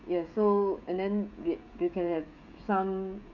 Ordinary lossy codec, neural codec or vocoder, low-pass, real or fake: none; codec, 16 kHz, 16 kbps, FreqCodec, smaller model; 7.2 kHz; fake